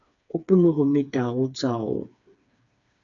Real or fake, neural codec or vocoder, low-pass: fake; codec, 16 kHz, 4 kbps, FreqCodec, smaller model; 7.2 kHz